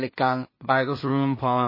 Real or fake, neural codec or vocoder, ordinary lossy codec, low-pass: fake; codec, 16 kHz in and 24 kHz out, 0.4 kbps, LongCat-Audio-Codec, two codebook decoder; MP3, 24 kbps; 5.4 kHz